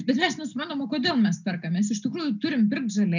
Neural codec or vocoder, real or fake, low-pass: none; real; 7.2 kHz